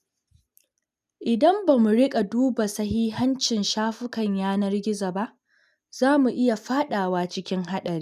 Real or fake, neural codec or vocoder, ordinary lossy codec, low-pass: real; none; none; 14.4 kHz